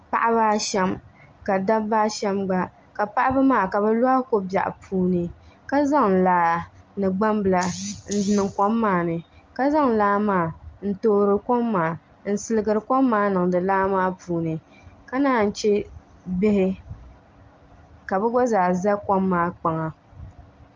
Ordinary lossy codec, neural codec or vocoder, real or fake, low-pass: Opus, 24 kbps; none; real; 7.2 kHz